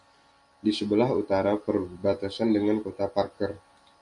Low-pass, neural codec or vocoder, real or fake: 10.8 kHz; none; real